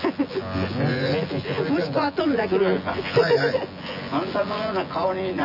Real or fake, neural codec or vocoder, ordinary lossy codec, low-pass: fake; vocoder, 24 kHz, 100 mel bands, Vocos; none; 5.4 kHz